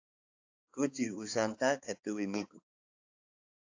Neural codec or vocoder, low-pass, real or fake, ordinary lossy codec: codec, 16 kHz, 4 kbps, X-Codec, HuBERT features, trained on balanced general audio; 7.2 kHz; fake; AAC, 48 kbps